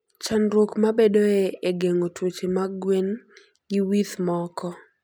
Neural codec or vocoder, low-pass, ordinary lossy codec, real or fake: none; 19.8 kHz; none; real